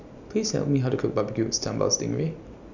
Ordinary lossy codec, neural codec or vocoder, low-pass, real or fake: none; none; 7.2 kHz; real